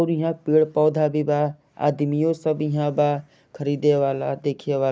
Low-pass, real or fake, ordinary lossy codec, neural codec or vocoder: none; real; none; none